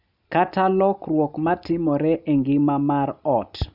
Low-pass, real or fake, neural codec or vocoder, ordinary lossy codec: 5.4 kHz; real; none; none